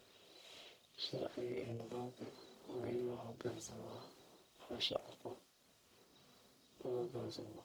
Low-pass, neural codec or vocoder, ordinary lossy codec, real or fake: none; codec, 44.1 kHz, 1.7 kbps, Pupu-Codec; none; fake